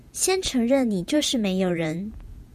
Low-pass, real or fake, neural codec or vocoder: 14.4 kHz; fake; vocoder, 48 kHz, 128 mel bands, Vocos